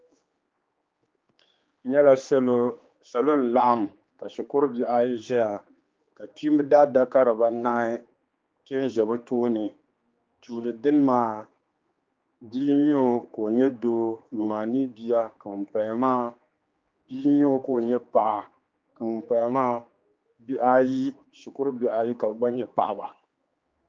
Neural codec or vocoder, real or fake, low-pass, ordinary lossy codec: codec, 16 kHz, 2 kbps, X-Codec, HuBERT features, trained on general audio; fake; 7.2 kHz; Opus, 24 kbps